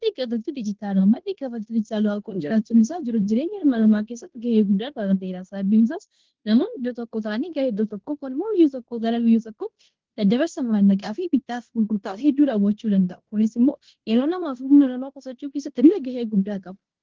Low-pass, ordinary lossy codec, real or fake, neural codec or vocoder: 7.2 kHz; Opus, 16 kbps; fake; codec, 16 kHz in and 24 kHz out, 0.9 kbps, LongCat-Audio-Codec, fine tuned four codebook decoder